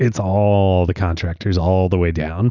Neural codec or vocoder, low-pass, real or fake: none; 7.2 kHz; real